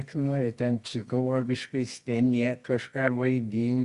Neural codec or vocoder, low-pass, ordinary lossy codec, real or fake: codec, 24 kHz, 0.9 kbps, WavTokenizer, medium music audio release; 10.8 kHz; Opus, 64 kbps; fake